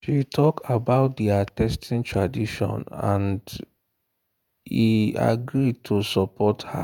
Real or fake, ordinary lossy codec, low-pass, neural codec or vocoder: fake; none; 19.8 kHz; vocoder, 48 kHz, 128 mel bands, Vocos